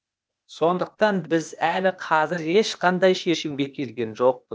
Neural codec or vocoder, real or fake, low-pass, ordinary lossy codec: codec, 16 kHz, 0.8 kbps, ZipCodec; fake; none; none